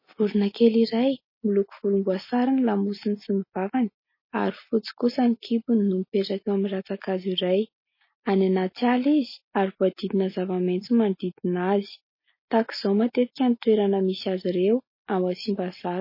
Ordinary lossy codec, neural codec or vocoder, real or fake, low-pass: MP3, 24 kbps; none; real; 5.4 kHz